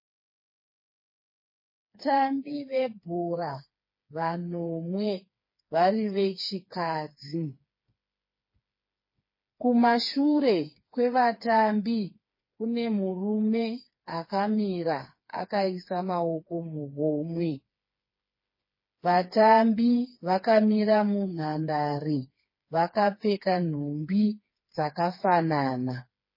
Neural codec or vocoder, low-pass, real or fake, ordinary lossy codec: codec, 16 kHz, 4 kbps, FreqCodec, smaller model; 5.4 kHz; fake; MP3, 24 kbps